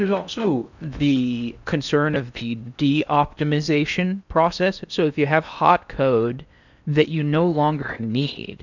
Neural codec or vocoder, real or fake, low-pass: codec, 16 kHz in and 24 kHz out, 0.8 kbps, FocalCodec, streaming, 65536 codes; fake; 7.2 kHz